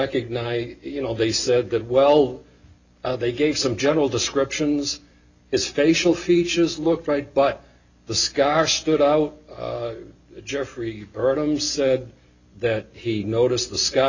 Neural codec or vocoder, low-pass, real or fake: none; 7.2 kHz; real